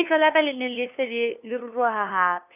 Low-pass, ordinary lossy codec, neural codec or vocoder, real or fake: 3.6 kHz; none; codec, 16 kHz, 2 kbps, FunCodec, trained on LibriTTS, 25 frames a second; fake